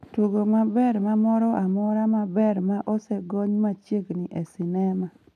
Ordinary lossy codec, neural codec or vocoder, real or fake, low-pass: none; none; real; 14.4 kHz